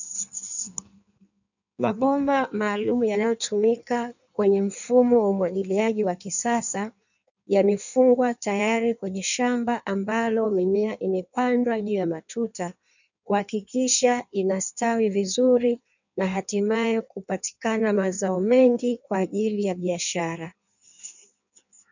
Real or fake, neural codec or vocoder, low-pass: fake; codec, 16 kHz in and 24 kHz out, 1.1 kbps, FireRedTTS-2 codec; 7.2 kHz